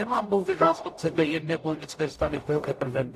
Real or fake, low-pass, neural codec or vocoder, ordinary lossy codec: fake; 14.4 kHz; codec, 44.1 kHz, 0.9 kbps, DAC; AAC, 64 kbps